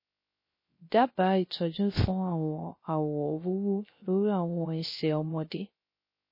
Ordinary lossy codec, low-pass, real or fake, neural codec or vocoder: MP3, 24 kbps; 5.4 kHz; fake; codec, 16 kHz, 0.3 kbps, FocalCodec